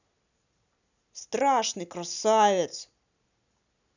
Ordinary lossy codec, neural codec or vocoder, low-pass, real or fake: none; none; 7.2 kHz; real